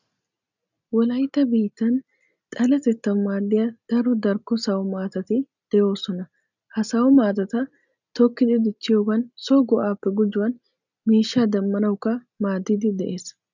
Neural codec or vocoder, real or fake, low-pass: none; real; 7.2 kHz